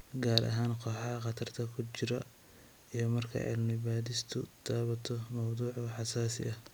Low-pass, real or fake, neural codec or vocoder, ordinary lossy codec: none; real; none; none